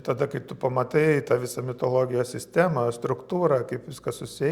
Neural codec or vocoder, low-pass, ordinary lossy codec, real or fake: none; 19.8 kHz; Opus, 64 kbps; real